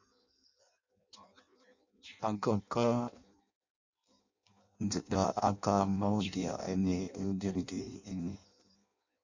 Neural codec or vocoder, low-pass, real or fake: codec, 16 kHz in and 24 kHz out, 0.6 kbps, FireRedTTS-2 codec; 7.2 kHz; fake